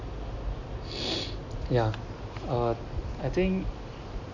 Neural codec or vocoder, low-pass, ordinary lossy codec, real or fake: none; 7.2 kHz; none; real